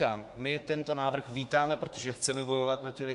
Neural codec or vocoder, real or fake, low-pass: codec, 24 kHz, 1 kbps, SNAC; fake; 10.8 kHz